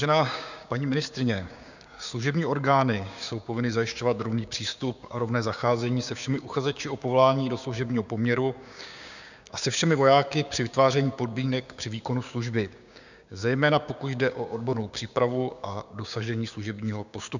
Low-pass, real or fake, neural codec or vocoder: 7.2 kHz; fake; codec, 16 kHz, 6 kbps, DAC